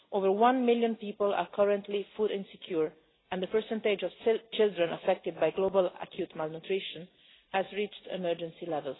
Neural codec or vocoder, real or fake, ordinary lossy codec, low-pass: none; real; AAC, 16 kbps; 7.2 kHz